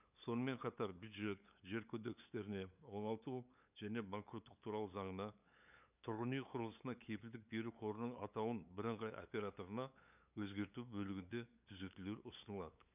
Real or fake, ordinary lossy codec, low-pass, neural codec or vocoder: fake; none; 3.6 kHz; codec, 16 kHz, 8 kbps, FunCodec, trained on LibriTTS, 25 frames a second